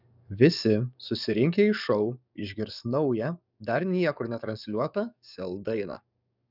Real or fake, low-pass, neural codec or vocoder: fake; 5.4 kHz; codec, 44.1 kHz, 7.8 kbps, DAC